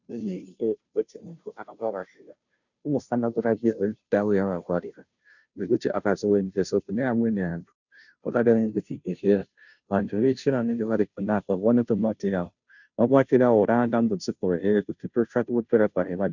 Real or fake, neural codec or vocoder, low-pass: fake; codec, 16 kHz, 0.5 kbps, FunCodec, trained on Chinese and English, 25 frames a second; 7.2 kHz